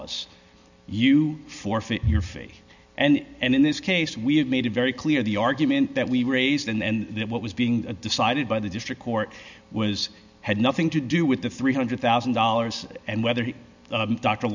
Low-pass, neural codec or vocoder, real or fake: 7.2 kHz; none; real